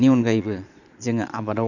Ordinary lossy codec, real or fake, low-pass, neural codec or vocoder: none; fake; 7.2 kHz; vocoder, 22.05 kHz, 80 mel bands, Vocos